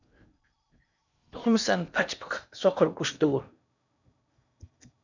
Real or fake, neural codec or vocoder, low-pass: fake; codec, 16 kHz in and 24 kHz out, 0.8 kbps, FocalCodec, streaming, 65536 codes; 7.2 kHz